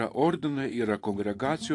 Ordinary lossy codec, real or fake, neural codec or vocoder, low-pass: AAC, 48 kbps; fake; vocoder, 44.1 kHz, 128 mel bands every 512 samples, BigVGAN v2; 10.8 kHz